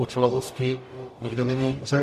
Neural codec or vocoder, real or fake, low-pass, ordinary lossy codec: codec, 44.1 kHz, 0.9 kbps, DAC; fake; 14.4 kHz; MP3, 64 kbps